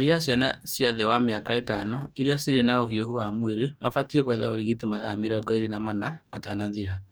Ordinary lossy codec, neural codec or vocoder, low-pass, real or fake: none; codec, 44.1 kHz, 2.6 kbps, DAC; none; fake